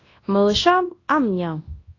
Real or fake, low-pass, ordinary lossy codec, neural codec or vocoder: fake; 7.2 kHz; AAC, 32 kbps; codec, 24 kHz, 0.9 kbps, WavTokenizer, large speech release